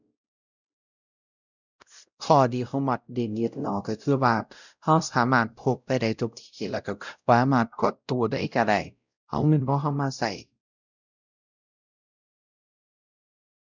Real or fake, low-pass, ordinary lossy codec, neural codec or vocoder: fake; 7.2 kHz; none; codec, 16 kHz, 0.5 kbps, X-Codec, WavLM features, trained on Multilingual LibriSpeech